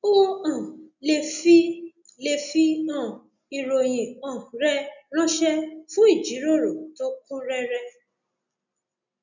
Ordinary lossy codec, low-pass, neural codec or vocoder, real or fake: none; 7.2 kHz; none; real